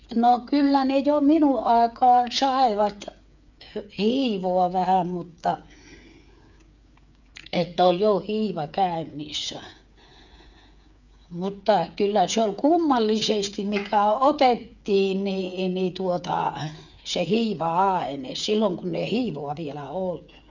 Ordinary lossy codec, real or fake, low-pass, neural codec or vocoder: none; fake; 7.2 kHz; codec, 16 kHz, 8 kbps, FreqCodec, smaller model